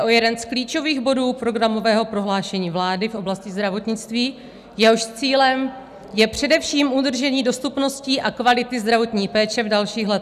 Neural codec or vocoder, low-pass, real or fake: none; 14.4 kHz; real